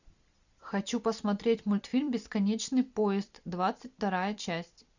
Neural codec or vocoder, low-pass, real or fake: vocoder, 44.1 kHz, 128 mel bands every 256 samples, BigVGAN v2; 7.2 kHz; fake